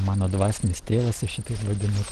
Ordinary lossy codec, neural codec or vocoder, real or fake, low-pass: Opus, 16 kbps; none; real; 10.8 kHz